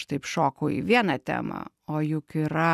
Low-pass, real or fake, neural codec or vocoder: 14.4 kHz; real; none